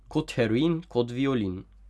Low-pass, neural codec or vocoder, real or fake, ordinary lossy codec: none; none; real; none